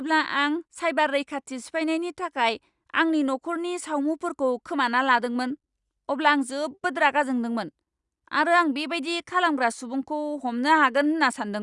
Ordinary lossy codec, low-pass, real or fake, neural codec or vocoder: Opus, 64 kbps; 10.8 kHz; real; none